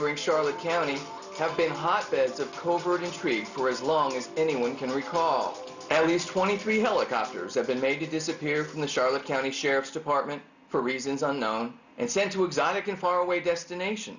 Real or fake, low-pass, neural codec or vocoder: real; 7.2 kHz; none